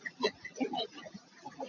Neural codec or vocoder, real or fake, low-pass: vocoder, 44.1 kHz, 80 mel bands, Vocos; fake; 7.2 kHz